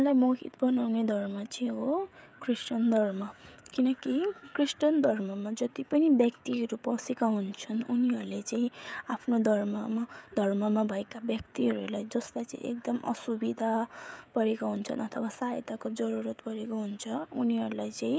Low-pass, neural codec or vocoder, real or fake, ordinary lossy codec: none; codec, 16 kHz, 16 kbps, FreqCodec, smaller model; fake; none